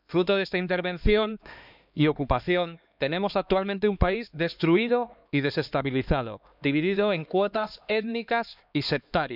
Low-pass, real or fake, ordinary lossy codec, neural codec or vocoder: 5.4 kHz; fake; none; codec, 16 kHz, 2 kbps, X-Codec, HuBERT features, trained on LibriSpeech